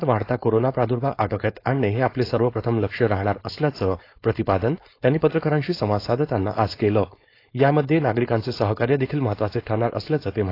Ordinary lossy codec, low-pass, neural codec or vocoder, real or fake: AAC, 32 kbps; 5.4 kHz; codec, 16 kHz, 4.8 kbps, FACodec; fake